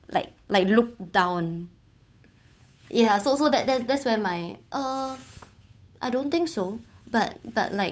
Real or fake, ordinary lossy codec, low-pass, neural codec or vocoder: fake; none; none; codec, 16 kHz, 8 kbps, FunCodec, trained on Chinese and English, 25 frames a second